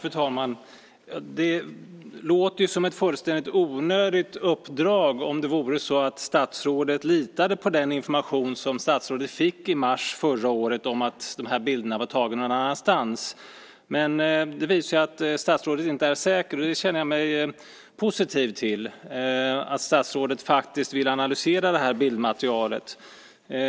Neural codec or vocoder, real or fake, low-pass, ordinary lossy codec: none; real; none; none